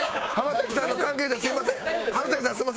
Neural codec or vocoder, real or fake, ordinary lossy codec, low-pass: codec, 16 kHz, 6 kbps, DAC; fake; none; none